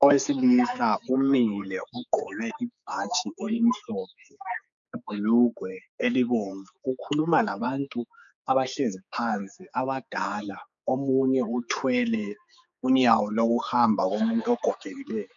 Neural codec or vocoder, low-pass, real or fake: codec, 16 kHz, 4 kbps, X-Codec, HuBERT features, trained on general audio; 7.2 kHz; fake